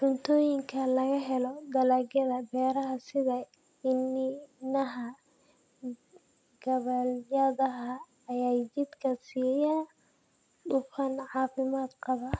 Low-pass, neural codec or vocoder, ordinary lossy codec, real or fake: none; none; none; real